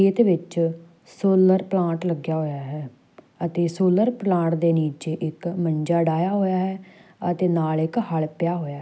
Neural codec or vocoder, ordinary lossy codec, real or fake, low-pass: none; none; real; none